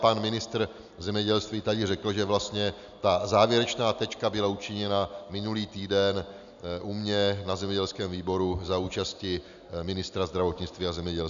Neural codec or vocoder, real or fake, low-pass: none; real; 7.2 kHz